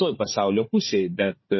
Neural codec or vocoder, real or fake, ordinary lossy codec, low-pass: codec, 16 kHz, 4 kbps, FunCodec, trained on Chinese and English, 50 frames a second; fake; MP3, 24 kbps; 7.2 kHz